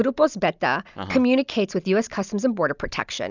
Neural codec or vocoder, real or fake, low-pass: none; real; 7.2 kHz